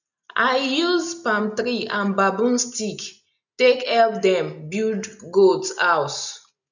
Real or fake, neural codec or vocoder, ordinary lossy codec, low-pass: real; none; none; 7.2 kHz